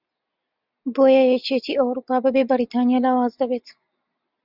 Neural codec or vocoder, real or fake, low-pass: none; real; 5.4 kHz